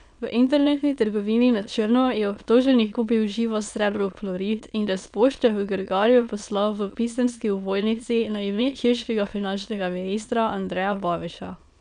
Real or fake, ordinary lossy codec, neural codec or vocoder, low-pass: fake; none; autoencoder, 22.05 kHz, a latent of 192 numbers a frame, VITS, trained on many speakers; 9.9 kHz